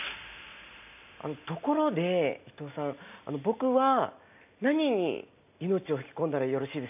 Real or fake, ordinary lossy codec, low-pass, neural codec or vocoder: real; AAC, 32 kbps; 3.6 kHz; none